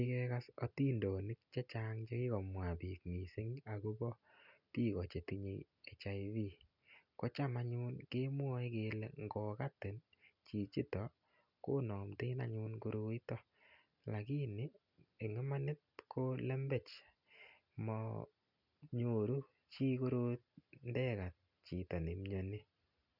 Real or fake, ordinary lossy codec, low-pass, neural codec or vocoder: real; none; 5.4 kHz; none